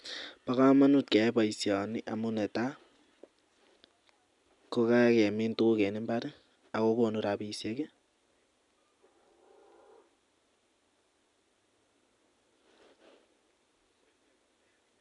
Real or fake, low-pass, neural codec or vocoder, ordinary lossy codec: real; 10.8 kHz; none; none